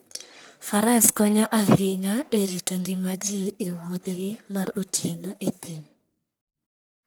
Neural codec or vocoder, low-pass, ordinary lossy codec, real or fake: codec, 44.1 kHz, 1.7 kbps, Pupu-Codec; none; none; fake